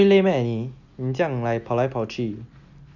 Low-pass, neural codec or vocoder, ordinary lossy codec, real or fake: 7.2 kHz; none; none; real